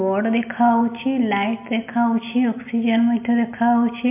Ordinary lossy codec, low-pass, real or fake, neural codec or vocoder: MP3, 32 kbps; 3.6 kHz; real; none